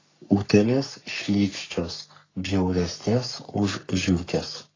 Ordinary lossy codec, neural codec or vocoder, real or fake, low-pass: AAC, 32 kbps; codec, 44.1 kHz, 3.4 kbps, Pupu-Codec; fake; 7.2 kHz